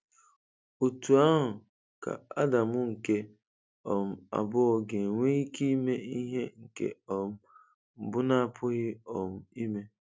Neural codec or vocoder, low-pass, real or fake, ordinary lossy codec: none; none; real; none